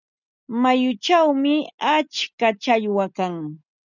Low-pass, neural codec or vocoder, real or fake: 7.2 kHz; none; real